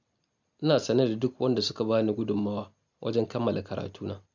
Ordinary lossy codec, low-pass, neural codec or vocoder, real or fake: none; 7.2 kHz; none; real